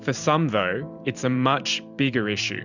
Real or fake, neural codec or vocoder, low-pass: fake; vocoder, 44.1 kHz, 128 mel bands every 512 samples, BigVGAN v2; 7.2 kHz